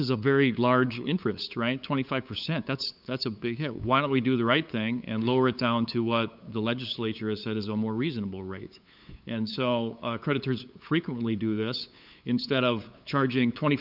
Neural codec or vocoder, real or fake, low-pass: codec, 16 kHz, 8 kbps, FunCodec, trained on LibriTTS, 25 frames a second; fake; 5.4 kHz